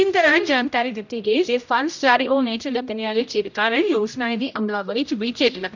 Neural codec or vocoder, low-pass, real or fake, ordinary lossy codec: codec, 16 kHz, 0.5 kbps, X-Codec, HuBERT features, trained on general audio; 7.2 kHz; fake; none